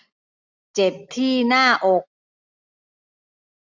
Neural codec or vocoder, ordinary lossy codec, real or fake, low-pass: none; none; real; 7.2 kHz